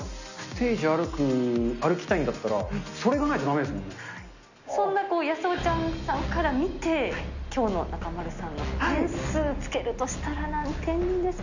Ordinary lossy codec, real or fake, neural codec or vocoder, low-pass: none; real; none; 7.2 kHz